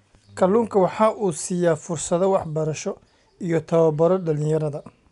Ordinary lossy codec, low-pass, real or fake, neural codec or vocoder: MP3, 96 kbps; 10.8 kHz; real; none